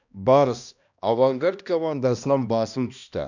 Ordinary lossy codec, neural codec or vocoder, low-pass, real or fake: none; codec, 16 kHz, 1 kbps, X-Codec, HuBERT features, trained on balanced general audio; 7.2 kHz; fake